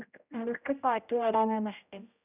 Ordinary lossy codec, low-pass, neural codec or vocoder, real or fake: none; 3.6 kHz; codec, 16 kHz, 0.5 kbps, X-Codec, HuBERT features, trained on general audio; fake